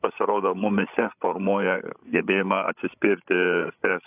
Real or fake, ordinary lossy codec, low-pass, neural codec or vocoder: fake; AAC, 32 kbps; 3.6 kHz; codec, 16 kHz, 16 kbps, FreqCodec, larger model